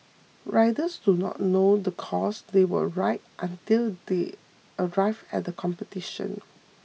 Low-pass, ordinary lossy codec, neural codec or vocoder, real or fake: none; none; none; real